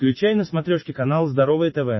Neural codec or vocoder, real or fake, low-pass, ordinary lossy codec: none; real; 7.2 kHz; MP3, 24 kbps